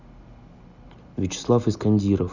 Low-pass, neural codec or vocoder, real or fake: 7.2 kHz; none; real